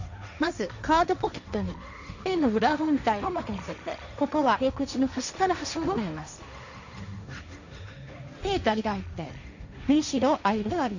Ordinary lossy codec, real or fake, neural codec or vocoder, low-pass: none; fake; codec, 16 kHz, 1.1 kbps, Voila-Tokenizer; 7.2 kHz